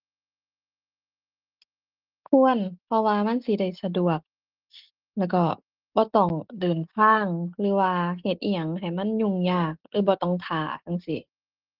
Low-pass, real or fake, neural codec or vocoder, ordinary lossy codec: 5.4 kHz; real; none; Opus, 16 kbps